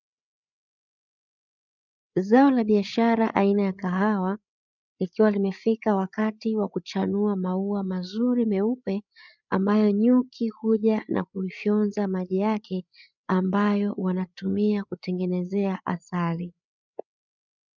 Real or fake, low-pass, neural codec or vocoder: fake; 7.2 kHz; codec, 16 kHz, 8 kbps, FreqCodec, larger model